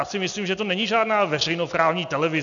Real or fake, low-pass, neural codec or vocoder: real; 7.2 kHz; none